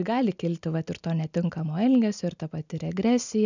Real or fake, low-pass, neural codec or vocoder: real; 7.2 kHz; none